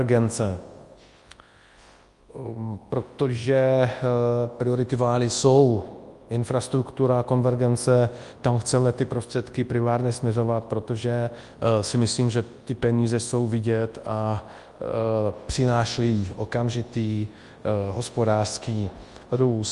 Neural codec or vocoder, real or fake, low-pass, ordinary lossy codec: codec, 24 kHz, 0.9 kbps, WavTokenizer, large speech release; fake; 10.8 kHz; Opus, 32 kbps